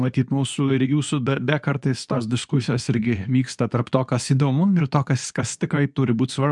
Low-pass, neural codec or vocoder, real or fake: 10.8 kHz; codec, 24 kHz, 0.9 kbps, WavTokenizer, medium speech release version 2; fake